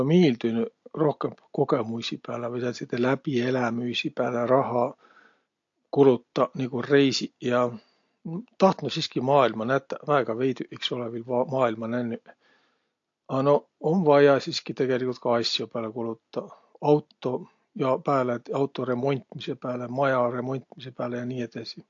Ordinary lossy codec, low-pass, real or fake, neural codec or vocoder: AAC, 48 kbps; 7.2 kHz; real; none